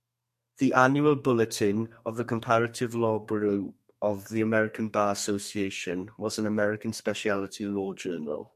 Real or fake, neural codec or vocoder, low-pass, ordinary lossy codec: fake; codec, 32 kHz, 1.9 kbps, SNAC; 14.4 kHz; MP3, 64 kbps